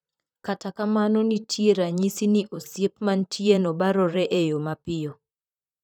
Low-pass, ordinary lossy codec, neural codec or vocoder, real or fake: 19.8 kHz; none; vocoder, 44.1 kHz, 128 mel bands, Pupu-Vocoder; fake